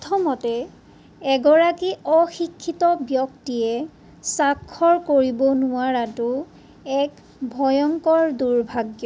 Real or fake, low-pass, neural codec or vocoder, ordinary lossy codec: real; none; none; none